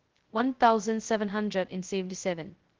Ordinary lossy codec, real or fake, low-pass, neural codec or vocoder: Opus, 16 kbps; fake; 7.2 kHz; codec, 16 kHz, 0.2 kbps, FocalCodec